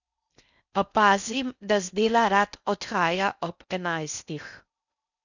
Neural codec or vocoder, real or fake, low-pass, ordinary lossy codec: codec, 16 kHz in and 24 kHz out, 0.6 kbps, FocalCodec, streaming, 4096 codes; fake; 7.2 kHz; none